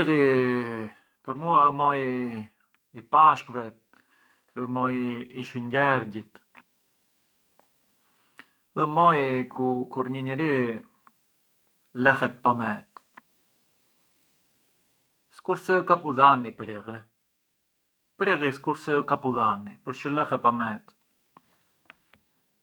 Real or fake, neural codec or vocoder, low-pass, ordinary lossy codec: fake; codec, 44.1 kHz, 2.6 kbps, SNAC; none; none